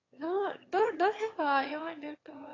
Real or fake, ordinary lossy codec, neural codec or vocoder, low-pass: fake; AAC, 32 kbps; autoencoder, 22.05 kHz, a latent of 192 numbers a frame, VITS, trained on one speaker; 7.2 kHz